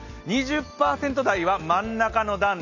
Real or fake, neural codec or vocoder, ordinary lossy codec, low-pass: real; none; none; 7.2 kHz